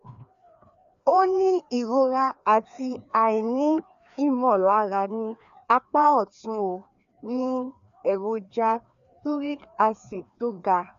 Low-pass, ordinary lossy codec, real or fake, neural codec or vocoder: 7.2 kHz; none; fake; codec, 16 kHz, 2 kbps, FreqCodec, larger model